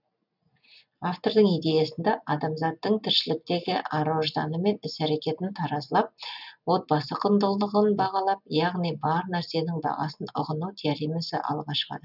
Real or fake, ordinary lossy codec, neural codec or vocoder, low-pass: real; none; none; 5.4 kHz